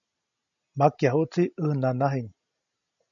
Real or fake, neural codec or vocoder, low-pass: real; none; 7.2 kHz